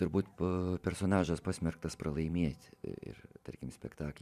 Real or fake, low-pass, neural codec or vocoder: fake; 14.4 kHz; vocoder, 44.1 kHz, 128 mel bands every 512 samples, BigVGAN v2